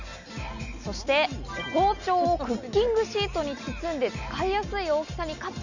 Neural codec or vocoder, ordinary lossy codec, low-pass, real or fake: none; none; 7.2 kHz; real